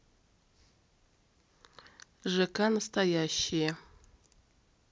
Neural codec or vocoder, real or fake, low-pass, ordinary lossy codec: none; real; none; none